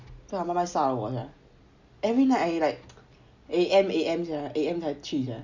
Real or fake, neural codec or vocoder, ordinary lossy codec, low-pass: real; none; Opus, 64 kbps; 7.2 kHz